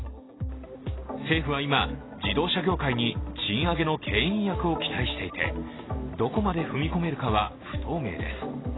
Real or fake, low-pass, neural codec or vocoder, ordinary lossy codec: real; 7.2 kHz; none; AAC, 16 kbps